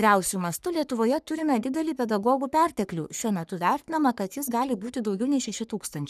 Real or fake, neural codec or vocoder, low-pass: fake; codec, 44.1 kHz, 3.4 kbps, Pupu-Codec; 14.4 kHz